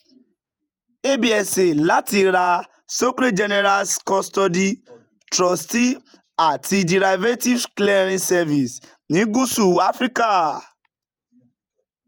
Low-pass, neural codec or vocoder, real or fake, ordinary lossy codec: none; vocoder, 48 kHz, 128 mel bands, Vocos; fake; none